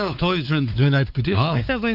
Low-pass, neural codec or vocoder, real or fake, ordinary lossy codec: 5.4 kHz; codec, 16 kHz, 4 kbps, X-Codec, WavLM features, trained on Multilingual LibriSpeech; fake; none